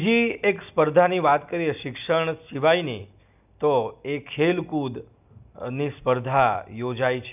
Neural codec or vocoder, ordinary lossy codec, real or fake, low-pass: none; none; real; 3.6 kHz